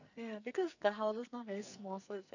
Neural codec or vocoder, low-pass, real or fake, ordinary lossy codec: codec, 44.1 kHz, 2.6 kbps, SNAC; 7.2 kHz; fake; none